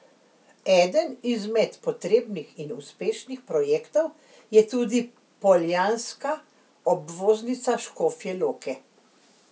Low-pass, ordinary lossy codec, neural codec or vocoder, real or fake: none; none; none; real